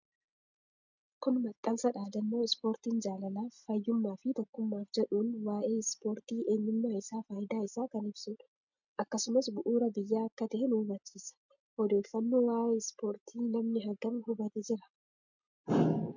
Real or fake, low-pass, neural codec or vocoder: real; 7.2 kHz; none